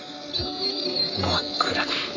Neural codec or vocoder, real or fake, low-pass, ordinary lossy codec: codec, 44.1 kHz, 3.4 kbps, Pupu-Codec; fake; 7.2 kHz; none